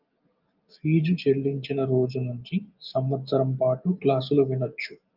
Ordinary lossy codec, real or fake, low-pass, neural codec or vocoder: Opus, 24 kbps; real; 5.4 kHz; none